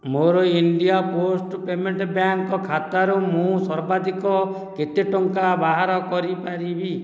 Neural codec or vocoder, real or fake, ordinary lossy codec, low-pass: none; real; none; none